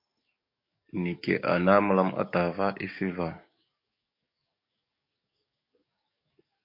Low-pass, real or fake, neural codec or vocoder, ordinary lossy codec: 5.4 kHz; fake; codec, 44.1 kHz, 7.8 kbps, DAC; MP3, 32 kbps